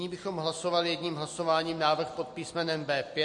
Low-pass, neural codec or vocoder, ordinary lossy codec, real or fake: 10.8 kHz; none; MP3, 48 kbps; real